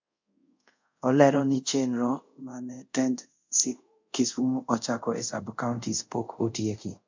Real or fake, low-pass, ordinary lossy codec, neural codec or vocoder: fake; 7.2 kHz; MP3, 64 kbps; codec, 24 kHz, 0.5 kbps, DualCodec